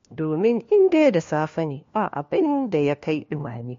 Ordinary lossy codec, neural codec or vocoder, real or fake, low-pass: MP3, 48 kbps; codec, 16 kHz, 1 kbps, FunCodec, trained on LibriTTS, 50 frames a second; fake; 7.2 kHz